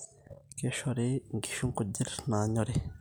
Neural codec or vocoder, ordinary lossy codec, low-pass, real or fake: none; none; none; real